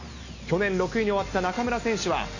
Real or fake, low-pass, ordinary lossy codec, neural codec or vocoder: real; 7.2 kHz; none; none